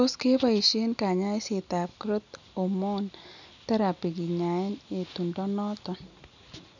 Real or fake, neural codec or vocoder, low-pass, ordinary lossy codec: real; none; 7.2 kHz; none